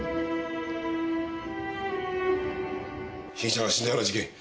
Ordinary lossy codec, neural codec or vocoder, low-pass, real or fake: none; none; none; real